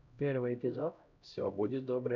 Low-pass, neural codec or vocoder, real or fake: 7.2 kHz; codec, 16 kHz, 0.5 kbps, X-Codec, HuBERT features, trained on LibriSpeech; fake